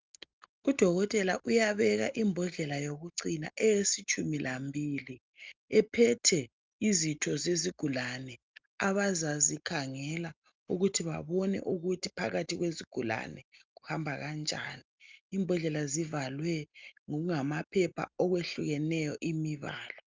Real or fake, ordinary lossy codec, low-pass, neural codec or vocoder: real; Opus, 24 kbps; 7.2 kHz; none